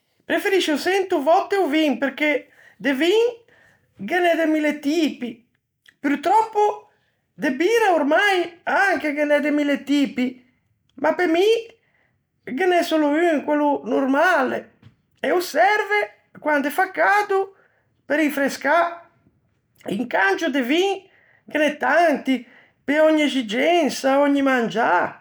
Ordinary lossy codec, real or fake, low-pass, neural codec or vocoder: none; real; none; none